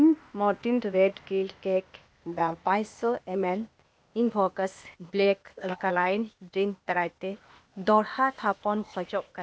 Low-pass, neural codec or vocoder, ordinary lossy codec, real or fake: none; codec, 16 kHz, 0.8 kbps, ZipCodec; none; fake